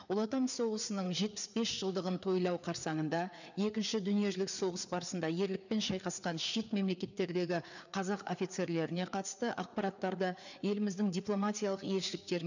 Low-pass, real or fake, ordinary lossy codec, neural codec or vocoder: 7.2 kHz; fake; none; codec, 16 kHz, 4 kbps, FreqCodec, larger model